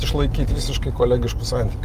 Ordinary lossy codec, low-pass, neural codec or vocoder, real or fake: Opus, 32 kbps; 14.4 kHz; none; real